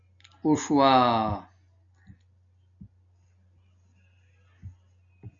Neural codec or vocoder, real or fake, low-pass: none; real; 7.2 kHz